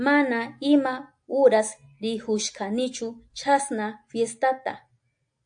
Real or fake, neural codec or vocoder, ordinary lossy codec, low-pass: real; none; AAC, 64 kbps; 9.9 kHz